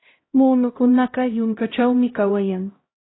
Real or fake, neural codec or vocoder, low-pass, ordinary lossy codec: fake; codec, 16 kHz, 0.5 kbps, X-Codec, HuBERT features, trained on LibriSpeech; 7.2 kHz; AAC, 16 kbps